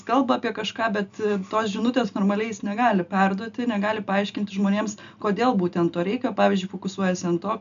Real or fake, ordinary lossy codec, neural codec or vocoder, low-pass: real; AAC, 96 kbps; none; 7.2 kHz